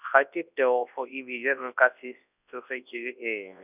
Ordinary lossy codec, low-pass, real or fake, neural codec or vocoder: none; 3.6 kHz; fake; codec, 24 kHz, 0.9 kbps, WavTokenizer, large speech release